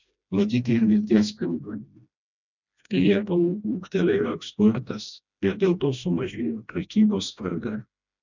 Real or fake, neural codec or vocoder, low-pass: fake; codec, 16 kHz, 1 kbps, FreqCodec, smaller model; 7.2 kHz